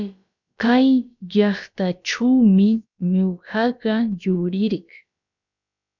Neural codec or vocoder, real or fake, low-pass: codec, 16 kHz, about 1 kbps, DyCAST, with the encoder's durations; fake; 7.2 kHz